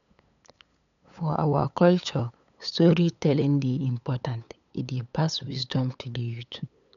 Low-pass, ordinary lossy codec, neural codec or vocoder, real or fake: 7.2 kHz; none; codec, 16 kHz, 8 kbps, FunCodec, trained on LibriTTS, 25 frames a second; fake